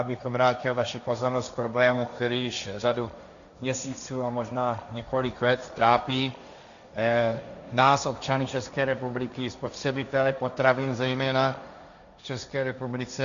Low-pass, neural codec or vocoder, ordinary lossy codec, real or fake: 7.2 kHz; codec, 16 kHz, 1.1 kbps, Voila-Tokenizer; MP3, 96 kbps; fake